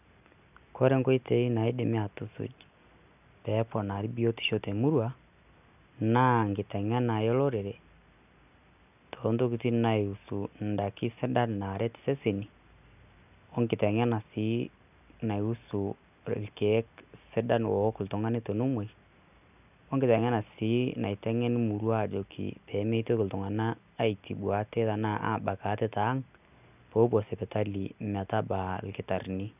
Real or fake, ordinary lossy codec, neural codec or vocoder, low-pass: real; none; none; 3.6 kHz